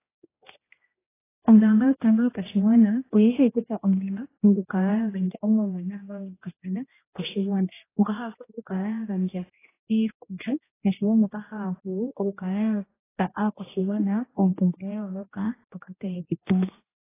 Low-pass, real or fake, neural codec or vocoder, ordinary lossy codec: 3.6 kHz; fake; codec, 16 kHz, 1 kbps, X-Codec, HuBERT features, trained on general audio; AAC, 16 kbps